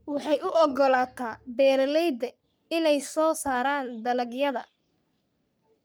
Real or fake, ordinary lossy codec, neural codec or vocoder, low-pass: fake; none; codec, 44.1 kHz, 3.4 kbps, Pupu-Codec; none